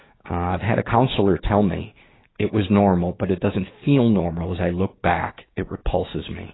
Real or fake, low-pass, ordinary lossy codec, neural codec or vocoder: fake; 7.2 kHz; AAC, 16 kbps; codec, 16 kHz, 6 kbps, DAC